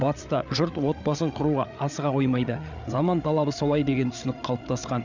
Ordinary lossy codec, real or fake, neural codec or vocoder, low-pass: none; fake; codec, 16 kHz, 8 kbps, FreqCodec, larger model; 7.2 kHz